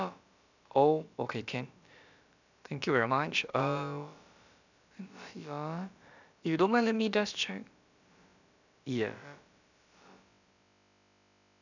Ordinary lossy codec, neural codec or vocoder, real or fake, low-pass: none; codec, 16 kHz, about 1 kbps, DyCAST, with the encoder's durations; fake; 7.2 kHz